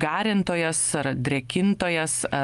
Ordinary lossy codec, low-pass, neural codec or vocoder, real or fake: Opus, 32 kbps; 10.8 kHz; none; real